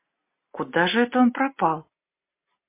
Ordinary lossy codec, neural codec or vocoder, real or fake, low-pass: MP3, 24 kbps; none; real; 3.6 kHz